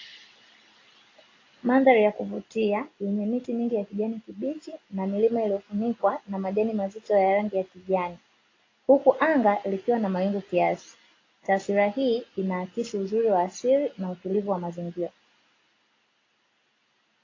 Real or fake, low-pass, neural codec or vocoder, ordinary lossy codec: real; 7.2 kHz; none; AAC, 32 kbps